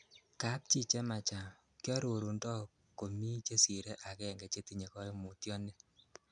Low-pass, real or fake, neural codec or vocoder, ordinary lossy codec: 9.9 kHz; real; none; none